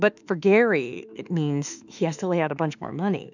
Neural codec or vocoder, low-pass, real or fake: autoencoder, 48 kHz, 32 numbers a frame, DAC-VAE, trained on Japanese speech; 7.2 kHz; fake